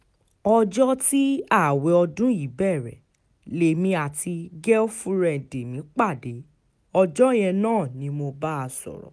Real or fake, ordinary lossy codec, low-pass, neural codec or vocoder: real; none; none; none